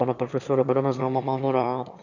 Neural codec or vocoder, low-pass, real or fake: autoencoder, 22.05 kHz, a latent of 192 numbers a frame, VITS, trained on one speaker; 7.2 kHz; fake